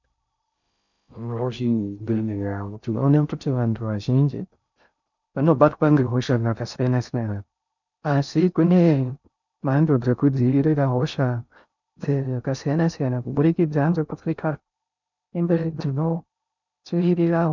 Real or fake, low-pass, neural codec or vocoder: fake; 7.2 kHz; codec, 16 kHz in and 24 kHz out, 0.6 kbps, FocalCodec, streaming, 4096 codes